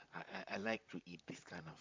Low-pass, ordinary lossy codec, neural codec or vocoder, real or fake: 7.2 kHz; MP3, 64 kbps; vocoder, 44.1 kHz, 128 mel bands, Pupu-Vocoder; fake